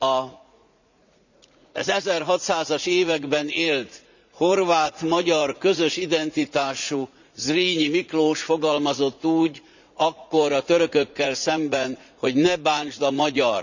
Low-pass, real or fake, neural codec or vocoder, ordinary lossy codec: 7.2 kHz; fake; vocoder, 44.1 kHz, 80 mel bands, Vocos; none